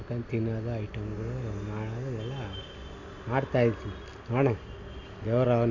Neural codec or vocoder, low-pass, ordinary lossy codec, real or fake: none; 7.2 kHz; none; real